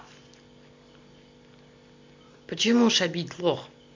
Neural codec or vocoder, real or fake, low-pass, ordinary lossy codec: none; real; 7.2 kHz; MP3, 48 kbps